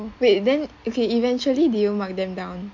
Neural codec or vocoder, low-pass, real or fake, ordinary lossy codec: none; 7.2 kHz; real; MP3, 64 kbps